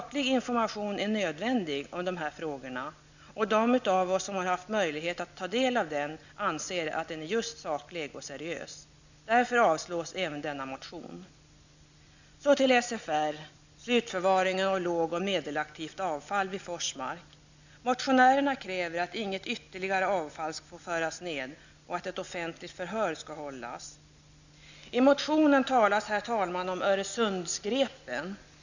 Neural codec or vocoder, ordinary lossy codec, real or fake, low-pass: none; none; real; 7.2 kHz